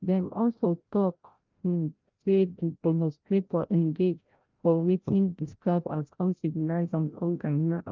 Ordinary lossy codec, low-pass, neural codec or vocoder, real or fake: Opus, 24 kbps; 7.2 kHz; codec, 16 kHz, 0.5 kbps, FreqCodec, larger model; fake